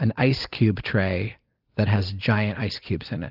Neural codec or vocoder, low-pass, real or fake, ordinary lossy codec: none; 5.4 kHz; real; Opus, 32 kbps